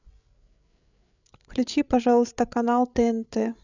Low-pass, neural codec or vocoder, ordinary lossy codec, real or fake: 7.2 kHz; codec, 16 kHz, 8 kbps, FreqCodec, larger model; none; fake